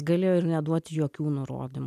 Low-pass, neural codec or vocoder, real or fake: 14.4 kHz; none; real